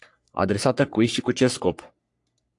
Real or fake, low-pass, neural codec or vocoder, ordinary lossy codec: fake; 10.8 kHz; codec, 44.1 kHz, 3.4 kbps, Pupu-Codec; AAC, 64 kbps